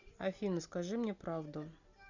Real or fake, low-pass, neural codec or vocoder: real; 7.2 kHz; none